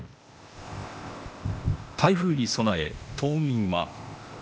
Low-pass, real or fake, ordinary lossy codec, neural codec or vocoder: none; fake; none; codec, 16 kHz, 0.8 kbps, ZipCodec